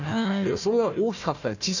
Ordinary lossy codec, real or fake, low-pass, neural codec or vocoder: none; fake; 7.2 kHz; codec, 16 kHz, 1 kbps, FunCodec, trained on Chinese and English, 50 frames a second